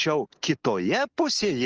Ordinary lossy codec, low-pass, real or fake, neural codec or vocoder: Opus, 24 kbps; 7.2 kHz; real; none